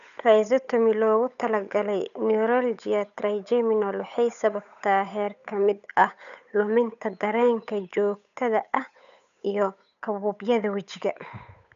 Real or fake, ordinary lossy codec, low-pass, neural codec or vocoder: fake; none; 7.2 kHz; codec, 16 kHz, 8 kbps, FunCodec, trained on Chinese and English, 25 frames a second